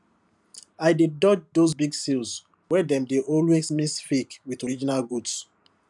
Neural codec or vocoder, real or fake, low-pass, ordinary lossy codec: vocoder, 44.1 kHz, 128 mel bands every 512 samples, BigVGAN v2; fake; 10.8 kHz; none